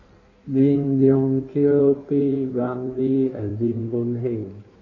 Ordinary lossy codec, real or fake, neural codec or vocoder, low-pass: none; fake; codec, 16 kHz in and 24 kHz out, 1.1 kbps, FireRedTTS-2 codec; 7.2 kHz